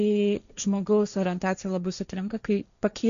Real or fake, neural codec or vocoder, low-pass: fake; codec, 16 kHz, 1.1 kbps, Voila-Tokenizer; 7.2 kHz